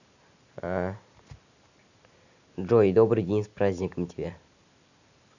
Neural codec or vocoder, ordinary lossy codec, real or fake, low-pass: none; none; real; 7.2 kHz